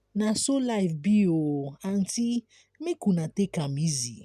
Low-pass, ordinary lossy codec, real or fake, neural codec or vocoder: 14.4 kHz; none; real; none